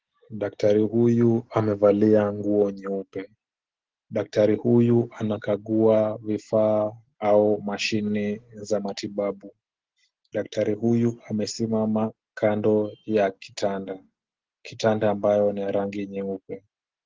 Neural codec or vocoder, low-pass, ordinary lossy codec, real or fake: none; 7.2 kHz; Opus, 16 kbps; real